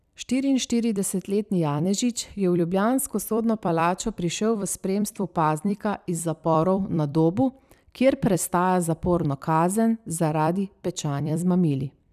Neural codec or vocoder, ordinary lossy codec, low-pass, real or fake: vocoder, 44.1 kHz, 128 mel bands every 256 samples, BigVGAN v2; none; 14.4 kHz; fake